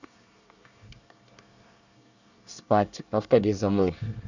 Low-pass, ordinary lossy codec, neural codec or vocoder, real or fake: 7.2 kHz; none; codec, 24 kHz, 1 kbps, SNAC; fake